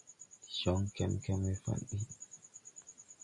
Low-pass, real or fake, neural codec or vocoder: 10.8 kHz; real; none